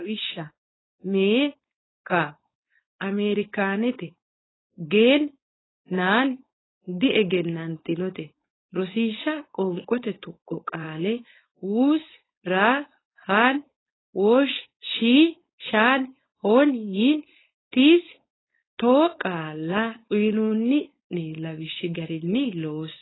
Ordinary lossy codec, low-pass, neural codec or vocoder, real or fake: AAC, 16 kbps; 7.2 kHz; codec, 16 kHz, 4.8 kbps, FACodec; fake